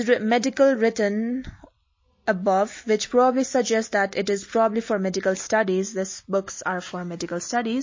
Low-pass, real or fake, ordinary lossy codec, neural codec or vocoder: 7.2 kHz; real; MP3, 32 kbps; none